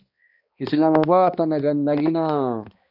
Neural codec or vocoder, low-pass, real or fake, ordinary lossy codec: codec, 16 kHz, 1 kbps, X-Codec, HuBERT features, trained on balanced general audio; 5.4 kHz; fake; AAC, 48 kbps